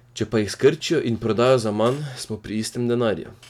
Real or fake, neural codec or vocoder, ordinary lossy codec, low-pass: real; none; none; 19.8 kHz